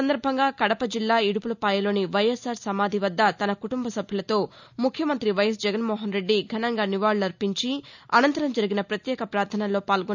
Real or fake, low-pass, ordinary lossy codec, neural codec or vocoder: real; 7.2 kHz; none; none